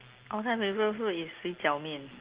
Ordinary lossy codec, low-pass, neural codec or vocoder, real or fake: Opus, 16 kbps; 3.6 kHz; none; real